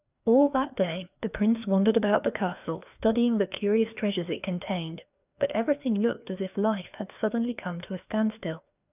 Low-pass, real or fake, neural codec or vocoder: 3.6 kHz; fake; codec, 16 kHz, 2 kbps, FreqCodec, larger model